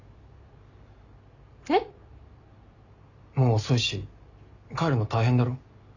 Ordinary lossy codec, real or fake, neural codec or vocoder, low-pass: none; real; none; 7.2 kHz